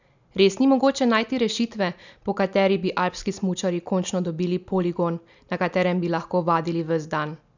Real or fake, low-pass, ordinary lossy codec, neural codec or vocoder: real; 7.2 kHz; none; none